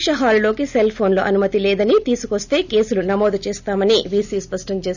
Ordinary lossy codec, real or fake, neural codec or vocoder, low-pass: none; real; none; 7.2 kHz